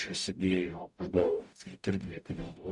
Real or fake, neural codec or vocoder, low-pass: fake; codec, 44.1 kHz, 0.9 kbps, DAC; 10.8 kHz